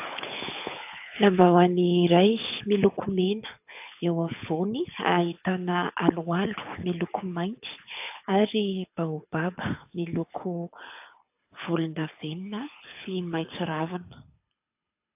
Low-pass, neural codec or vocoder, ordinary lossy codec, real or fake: 3.6 kHz; codec, 24 kHz, 6 kbps, HILCodec; AAC, 32 kbps; fake